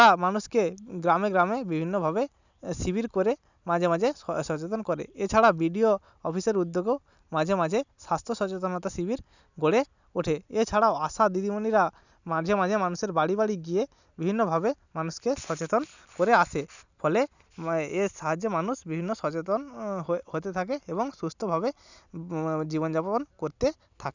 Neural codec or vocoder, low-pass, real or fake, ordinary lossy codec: none; 7.2 kHz; real; none